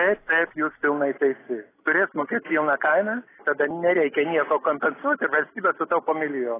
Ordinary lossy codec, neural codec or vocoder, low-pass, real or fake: AAC, 16 kbps; none; 3.6 kHz; real